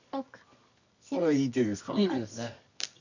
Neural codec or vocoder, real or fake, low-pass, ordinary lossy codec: codec, 24 kHz, 0.9 kbps, WavTokenizer, medium music audio release; fake; 7.2 kHz; none